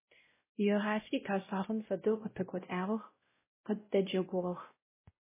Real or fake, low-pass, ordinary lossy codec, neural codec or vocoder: fake; 3.6 kHz; MP3, 16 kbps; codec, 16 kHz, 0.5 kbps, X-Codec, WavLM features, trained on Multilingual LibriSpeech